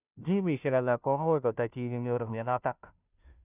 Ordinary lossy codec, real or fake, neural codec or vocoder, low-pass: none; fake; codec, 16 kHz, 0.5 kbps, FunCodec, trained on Chinese and English, 25 frames a second; 3.6 kHz